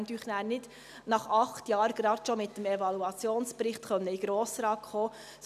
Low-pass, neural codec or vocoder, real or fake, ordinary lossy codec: 14.4 kHz; none; real; none